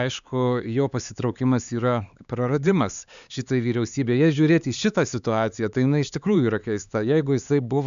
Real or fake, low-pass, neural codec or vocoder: fake; 7.2 kHz; codec, 16 kHz, 4 kbps, X-Codec, HuBERT features, trained on LibriSpeech